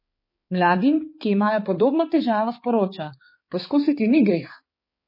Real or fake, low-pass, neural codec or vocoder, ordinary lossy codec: fake; 5.4 kHz; codec, 16 kHz, 4 kbps, X-Codec, HuBERT features, trained on balanced general audio; MP3, 24 kbps